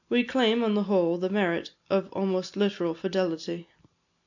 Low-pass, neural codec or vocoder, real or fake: 7.2 kHz; none; real